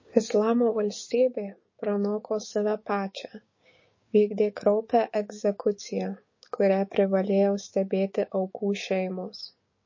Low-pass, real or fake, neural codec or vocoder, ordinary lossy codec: 7.2 kHz; fake; codec, 16 kHz, 6 kbps, DAC; MP3, 32 kbps